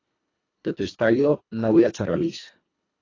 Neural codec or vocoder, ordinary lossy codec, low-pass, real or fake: codec, 24 kHz, 1.5 kbps, HILCodec; AAC, 32 kbps; 7.2 kHz; fake